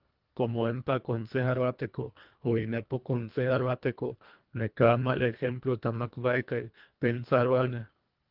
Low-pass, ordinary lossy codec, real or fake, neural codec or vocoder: 5.4 kHz; Opus, 32 kbps; fake; codec, 24 kHz, 1.5 kbps, HILCodec